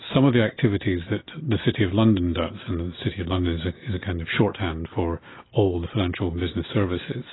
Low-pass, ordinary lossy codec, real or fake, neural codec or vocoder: 7.2 kHz; AAC, 16 kbps; real; none